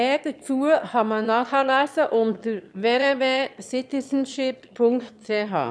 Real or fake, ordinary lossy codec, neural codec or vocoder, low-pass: fake; none; autoencoder, 22.05 kHz, a latent of 192 numbers a frame, VITS, trained on one speaker; none